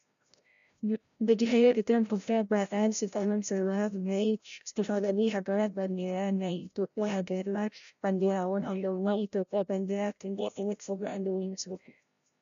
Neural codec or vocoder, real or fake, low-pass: codec, 16 kHz, 0.5 kbps, FreqCodec, larger model; fake; 7.2 kHz